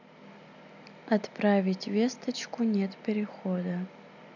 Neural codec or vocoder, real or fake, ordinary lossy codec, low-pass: none; real; none; 7.2 kHz